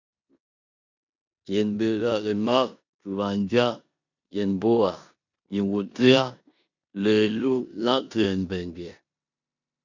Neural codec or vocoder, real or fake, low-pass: codec, 16 kHz in and 24 kHz out, 0.9 kbps, LongCat-Audio-Codec, four codebook decoder; fake; 7.2 kHz